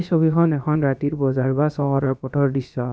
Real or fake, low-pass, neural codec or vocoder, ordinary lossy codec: fake; none; codec, 16 kHz, about 1 kbps, DyCAST, with the encoder's durations; none